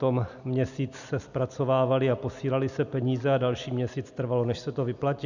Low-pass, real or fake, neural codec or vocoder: 7.2 kHz; real; none